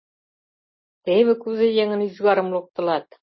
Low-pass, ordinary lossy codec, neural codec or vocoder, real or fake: 7.2 kHz; MP3, 24 kbps; none; real